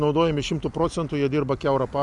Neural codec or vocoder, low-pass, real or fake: none; 10.8 kHz; real